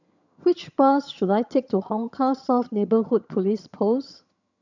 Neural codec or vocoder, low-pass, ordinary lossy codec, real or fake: vocoder, 22.05 kHz, 80 mel bands, HiFi-GAN; 7.2 kHz; none; fake